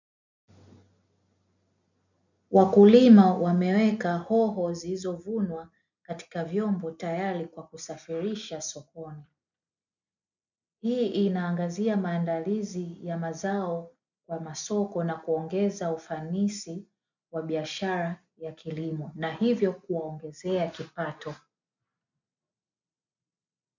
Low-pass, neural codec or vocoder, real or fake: 7.2 kHz; none; real